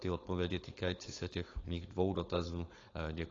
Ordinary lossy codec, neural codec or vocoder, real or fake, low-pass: AAC, 32 kbps; codec, 16 kHz, 4.8 kbps, FACodec; fake; 7.2 kHz